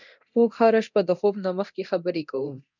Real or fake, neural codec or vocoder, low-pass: fake; codec, 24 kHz, 0.9 kbps, DualCodec; 7.2 kHz